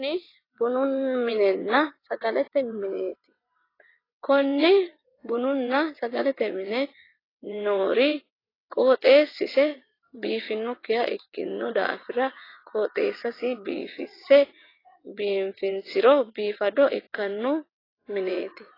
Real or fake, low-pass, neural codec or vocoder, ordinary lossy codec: fake; 5.4 kHz; vocoder, 22.05 kHz, 80 mel bands, WaveNeXt; AAC, 24 kbps